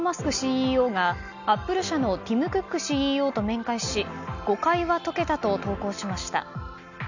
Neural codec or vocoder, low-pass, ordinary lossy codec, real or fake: none; 7.2 kHz; none; real